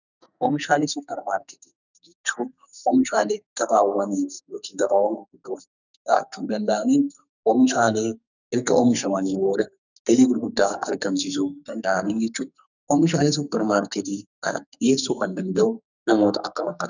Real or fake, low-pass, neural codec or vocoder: fake; 7.2 kHz; codec, 44.1 kHz, 2.6 kbps, SNAC